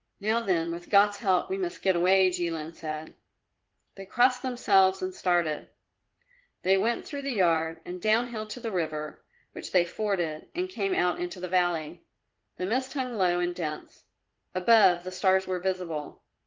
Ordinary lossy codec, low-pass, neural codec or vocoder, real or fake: Opus, 16 kbps; 7.2 kHz; vocoder, 44.1 kHz, 80 mel bands, Vocos; fake